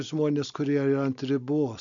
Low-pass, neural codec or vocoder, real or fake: 7.2 kHz; none; real